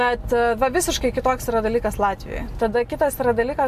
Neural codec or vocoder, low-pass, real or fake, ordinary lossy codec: none; 14.4 kHz; real; AAC, 96 kbps